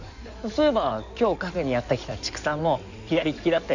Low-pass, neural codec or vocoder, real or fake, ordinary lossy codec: 7.2 kHz; codec, 16 kHz in and 24 kHz out, 2.2 kbps, FireRedTTS-2 codec; fake; none